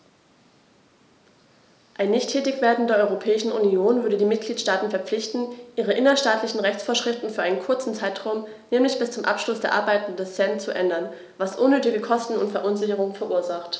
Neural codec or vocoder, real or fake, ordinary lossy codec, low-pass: none; real; none; none